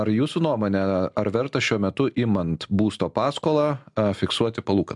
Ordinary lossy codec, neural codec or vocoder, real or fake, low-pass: MP3, 96 kbps; none; real; 10.8 kHz